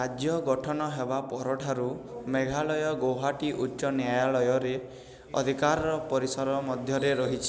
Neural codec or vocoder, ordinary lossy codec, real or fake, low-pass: none; none; real; none